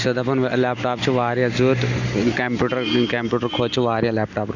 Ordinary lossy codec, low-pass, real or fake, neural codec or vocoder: none; 7.2 kHz; real; none